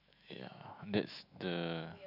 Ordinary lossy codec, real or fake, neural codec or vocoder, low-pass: none; fake; autoencoder, 48 kHz, 128 numbers a frame, DAC-VAE, trained on Japanese speech; 5.4 kHz